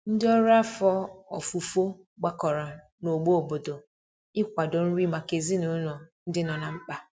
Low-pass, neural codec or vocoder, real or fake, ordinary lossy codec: none; none; real; none